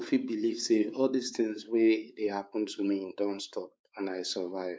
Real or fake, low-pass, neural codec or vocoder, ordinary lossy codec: fake; none; codec, 16 kHz, 4 kbps, X-Codec, WavLM features, trained on Multilingual LibriSpeech; none